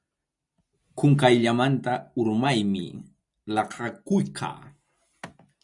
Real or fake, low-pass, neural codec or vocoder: real; 10.8 kHz; none